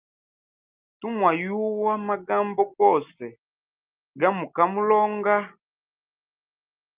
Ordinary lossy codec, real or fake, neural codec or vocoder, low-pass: Opus, 24 kbps; real; none; 3.6 kHz